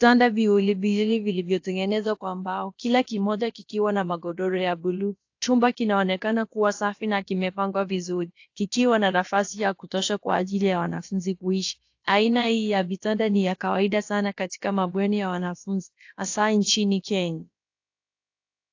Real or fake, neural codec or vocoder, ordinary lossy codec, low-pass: fake; codec, 16 kHz, about 1 kbps, DyCAST, with the encoder's durations; AAC, 48 kbps; 7.2 kHz